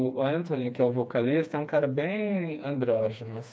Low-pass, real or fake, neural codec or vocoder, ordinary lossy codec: none; fake; codec, 16 kHz, 2 kbps, FreqCodec, smaller model; none